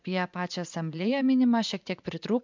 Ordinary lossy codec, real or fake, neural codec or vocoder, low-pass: MP3, 64 kbps; fake; vocoder, 44.1 kHz, 80 mel bands, Vocos; 7.2 kHz